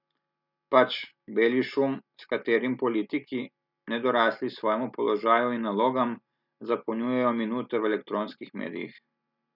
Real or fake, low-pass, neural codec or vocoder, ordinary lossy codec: real; 5.4 kHz; none; none